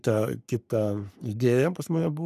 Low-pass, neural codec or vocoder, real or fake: 14.4 kHz; codec, 44.1 kHz, 3.4 kbps, Pupu-Codec; fake